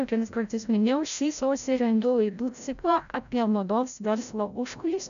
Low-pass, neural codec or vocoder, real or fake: 7.2 kHz; codec, 16 kHz, 0.5 kbps, FreqCodec, larger model; fake